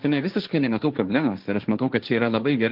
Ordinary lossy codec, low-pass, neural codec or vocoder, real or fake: Opus, 32 kbps; 5.4 kHz; codec, 16 kHz, 1.1 kbps, Voila-Tokenizer; fake